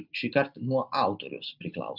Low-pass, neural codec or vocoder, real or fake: 5.4 kHz; none; real